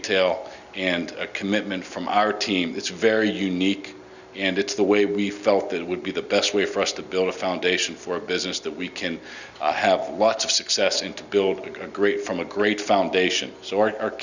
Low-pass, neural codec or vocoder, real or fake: 7.2 kHz; none; real